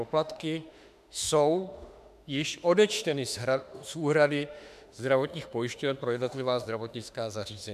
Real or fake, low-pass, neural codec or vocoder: fake; 14.4 kHz; autoencoder, 48 kHz, 32 numbers a frame, DAC-VAE, trained on Japanese speech